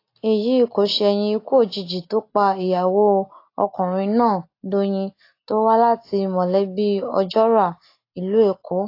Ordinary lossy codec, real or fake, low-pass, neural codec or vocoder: AAC, 32 kbps; real; 5.4 kHz; none